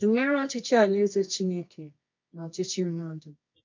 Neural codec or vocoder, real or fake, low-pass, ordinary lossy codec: codec, 24 kHz, 0.9 kbps, WavTokenizer, medium music audio release; fake; 7.2 kHz; MP3, 48 kbps